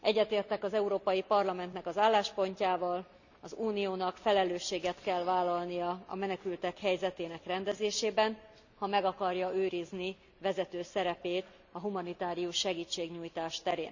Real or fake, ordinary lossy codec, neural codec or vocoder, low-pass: real; none; none; 7.2 kHz